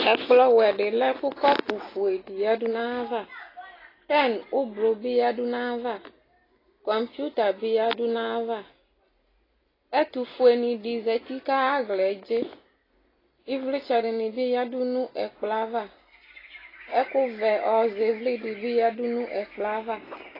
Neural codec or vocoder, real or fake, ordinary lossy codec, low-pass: none; real; AAC, 24 kbps; 5.4 kHz